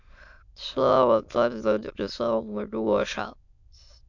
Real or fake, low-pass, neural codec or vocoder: fake; 7.2 kHz; autoencoder, 22.05 kHz, a latent of 192 numbers a frame, VITS, trained on many speakers